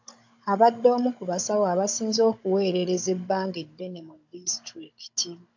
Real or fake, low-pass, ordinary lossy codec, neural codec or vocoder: fake; 7.2 kHz; AAC, 48 kbps; codec, 16 kHz, 16 kbps, FunCodec, trained on Chinese and English, 50 frames a second